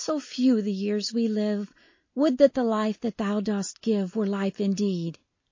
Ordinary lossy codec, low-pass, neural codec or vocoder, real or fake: MP3, 32 kbps; 7.2 kHz; none; real